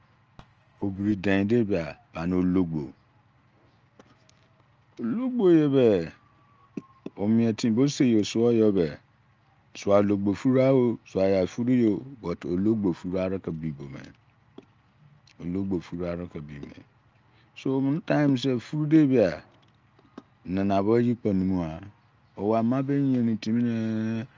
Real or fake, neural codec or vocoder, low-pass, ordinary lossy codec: real; none; 7.2 kHz; Opus, 24 kbps